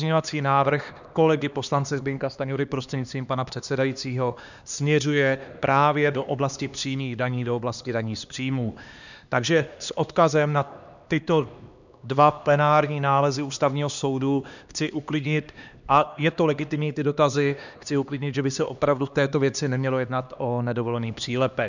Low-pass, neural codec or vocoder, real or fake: 7.2 kHz; codec, 16 kHz, 2 kbps, X-Codec, HuBERT features, trained on LibriSpeech; fake